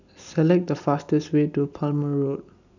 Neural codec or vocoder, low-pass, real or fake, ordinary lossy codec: none; 7.2 kHz; real; none